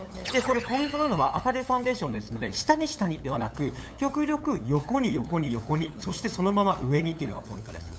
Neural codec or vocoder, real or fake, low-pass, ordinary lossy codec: codec, 16 kHz, 8 kbps, FunCodec, trained on LibriTTS, 25 frames a second; fake; none; none